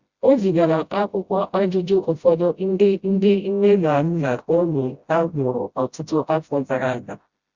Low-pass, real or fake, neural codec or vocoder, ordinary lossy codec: 7.2 kHz; fake; codec, 16 kHz, 0.5 kbps, FreqCodec, smaller model; Opus, 64 kbps